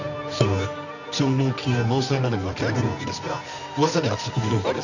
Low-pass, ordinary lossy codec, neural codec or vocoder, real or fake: 7.2 kHz; none; codec, 24 kHz, 0.9 kbps, WavTokenizer, medium music audio release; fake